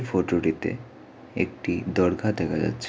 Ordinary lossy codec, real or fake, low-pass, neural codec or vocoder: none; real; none; none